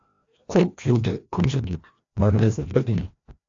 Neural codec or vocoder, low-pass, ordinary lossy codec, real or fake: codec, 16 kHz, 1 kbps, FreqCodec, larger model; 7.2 kHz; AAC, 48 kbps; fake